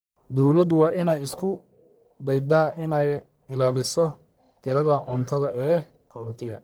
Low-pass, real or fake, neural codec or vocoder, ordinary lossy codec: none; fake; codec, 44.1 kHz, 1.7 kbps, Pupu-Codec; none